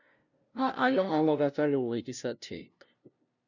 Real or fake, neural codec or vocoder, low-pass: fake; codec, 16 kHz, 0.5 kbps, FunCodec, trained on LibriTTS, 25 frames a second; 7.2 kHz